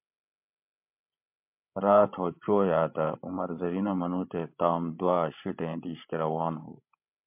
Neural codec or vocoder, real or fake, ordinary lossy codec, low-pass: codec, 16 kHz, 16 kbps, FreqCodec, larger model; fake; MP3, 32 kbps; 3.6 kHz